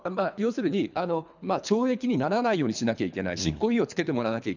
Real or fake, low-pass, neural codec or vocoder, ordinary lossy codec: fake; 7.2 kHz; codec, 24 kHz, 3 kbps, HILCodec; none